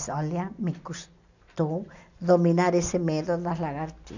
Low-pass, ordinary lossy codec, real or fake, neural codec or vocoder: 7.2 kHz; none; real; none